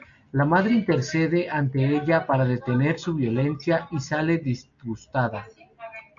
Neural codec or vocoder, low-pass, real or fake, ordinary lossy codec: none; 7.2 kHz; real; MP3, 64 kbps